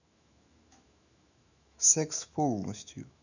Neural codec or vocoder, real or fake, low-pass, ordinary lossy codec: autoencoder, 48 kHz, 128 numbers a frame, DAC-VAE, trained on Japanese speech; fake; 7.2 kHz; none